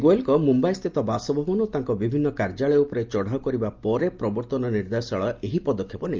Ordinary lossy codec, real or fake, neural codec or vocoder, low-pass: Opus, 24 kbps; real; none; 7.2 kHz